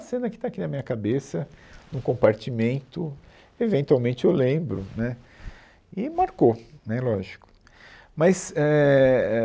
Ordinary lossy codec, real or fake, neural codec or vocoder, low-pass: none; real; none; none